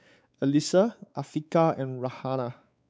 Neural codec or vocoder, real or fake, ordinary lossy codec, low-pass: codec, 16 kHz, 4 kbps, X-Codec, WavLM features, trained on Multilingual LibriSpeech; fake; none; none